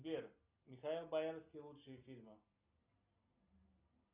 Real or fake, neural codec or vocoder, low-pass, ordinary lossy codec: real; none; 3.6 kHz; Opus, 64 kbps